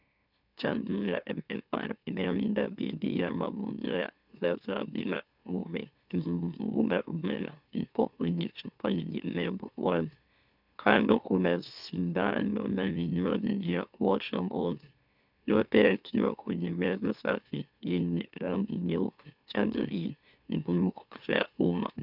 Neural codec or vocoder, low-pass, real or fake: autoencoder, 44.1 kHz, a latent of 192 numbers a frame, MeloTTS; 5.4 kHz; fake